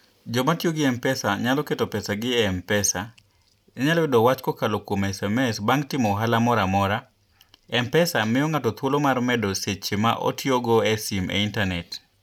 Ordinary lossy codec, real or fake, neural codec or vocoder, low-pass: none; real; none; 19.8 kHz